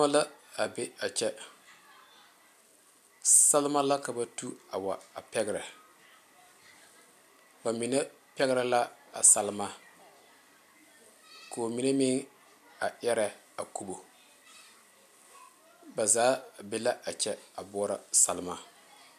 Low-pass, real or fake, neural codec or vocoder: 14.4 kHz; real; none